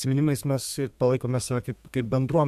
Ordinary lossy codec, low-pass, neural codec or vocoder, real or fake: AAC, 96 kbps; 14.4 kHz; codec, 32 kHz, 1.9 kbps, SNAC; fake